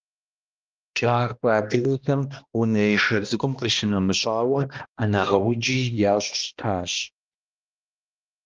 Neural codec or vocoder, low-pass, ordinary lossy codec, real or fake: codec, 16 kHz, 1 kbps, X-Codec, HuBERT features, trained on balanced general audio; 7.2 kHz; Opus, 32 kbps; fake